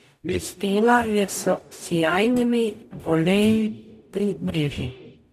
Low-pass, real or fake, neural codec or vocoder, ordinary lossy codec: 14.4 kHz; fake; codec, 44.1 kHz, 0.9 kbps, DAC; none